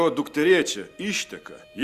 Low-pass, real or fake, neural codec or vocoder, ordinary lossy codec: 14.4 kHz; real; none; Opus, 64 kbps